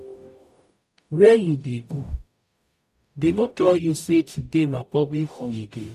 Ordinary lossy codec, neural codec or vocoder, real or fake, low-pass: none; codec, 44.1 kHz, 0.9 kbps, DAC; fake; 14.4 kHz